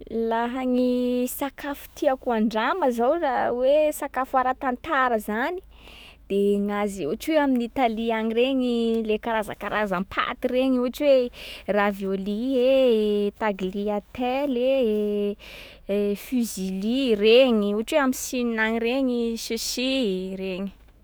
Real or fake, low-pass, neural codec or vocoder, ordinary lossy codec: fake; none; autoencoder, 48 kHz, 128 numbers a frame, DAC-VAE, trained on Japanese speech; none